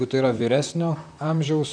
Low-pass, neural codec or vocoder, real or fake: 9.9 kHz; vocoder, 44.1 kHz, 128 mel bands, Pupu-Vocoder; fake